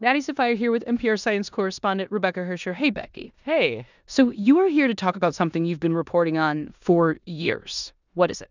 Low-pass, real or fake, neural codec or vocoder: 7.2 kHz; fake; codec, 16 kHz in and 24 kHz out, 0.9 kbps, LongCat-Audio-Codec, four codebook decoder